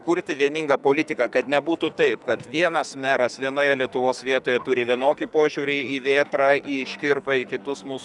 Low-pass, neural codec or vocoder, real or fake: 10.8 kHz; codec, 44.1 kHz, 2.6 kbps, SNAC; fake